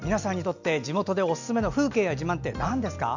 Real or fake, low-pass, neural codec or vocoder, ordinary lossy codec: real; 7.2 kHz; none; none